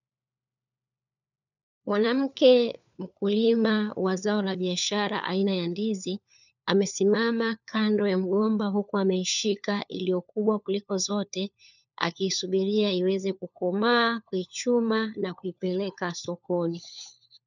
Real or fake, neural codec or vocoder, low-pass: fake; codec, 16 kHz, 4 kbps, FunCodec, trained on LibriTTS, 50 frames a second; 7.2 kHz